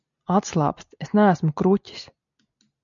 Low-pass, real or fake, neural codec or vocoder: 7.2 kHz; real; none